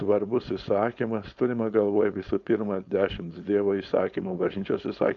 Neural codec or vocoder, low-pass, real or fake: codec, 16 kHz, 4.8 kbps, FACodec; 7.2 kHz; fake